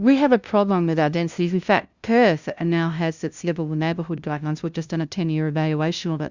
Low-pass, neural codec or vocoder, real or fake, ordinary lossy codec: 7.2 kHz; codec, 16 kHz, 0.5 kbps, FunCodec, trained on LibriTTS, 25 frames a second; fake; Opus, 64 kbps